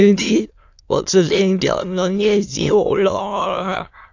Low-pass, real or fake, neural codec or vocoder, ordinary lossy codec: 7.2 kHz; fake; autoencoder, 22.05 kHz, a latent of 192 numbers a frame, VITS, trained on many speakers; AAC, 48 kbps